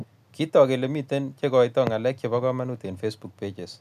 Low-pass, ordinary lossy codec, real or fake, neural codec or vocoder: 14.4 kHz; none; real; none